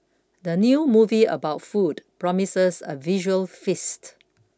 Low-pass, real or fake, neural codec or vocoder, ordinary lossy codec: none; real; none; none